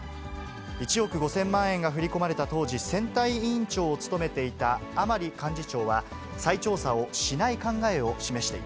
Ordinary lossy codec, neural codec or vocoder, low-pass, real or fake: none; none; none; real